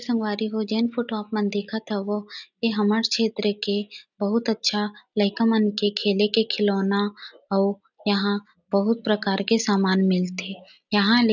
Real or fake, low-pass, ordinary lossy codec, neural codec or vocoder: real; 7.2 kHz; none; none